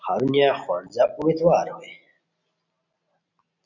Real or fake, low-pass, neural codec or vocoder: real; 7.2 kHz; none